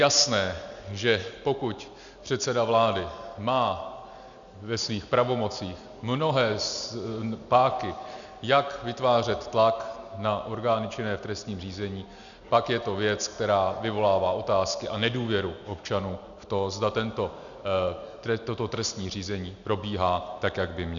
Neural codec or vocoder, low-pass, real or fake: none; 7.2 kHz; real